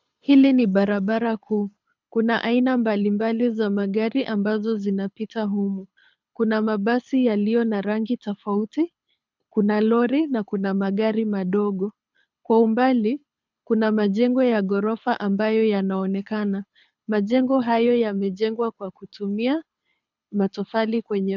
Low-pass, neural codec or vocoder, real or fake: 7.2 kHz; codec, 24 kHz, 6 kbps, HILCodec; fake